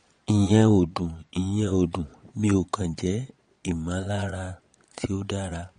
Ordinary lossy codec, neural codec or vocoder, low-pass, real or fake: MP3, 48 kbps; vocoder, 22.05 kHz, 80 mel bands, Vocos; 9.9 kHz; fake